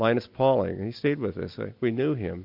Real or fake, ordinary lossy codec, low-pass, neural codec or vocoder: real; MP3, 48 kbps; 5.4 kHz; none